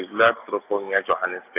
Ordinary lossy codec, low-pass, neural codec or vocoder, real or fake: none; 3.6 kHz; none; real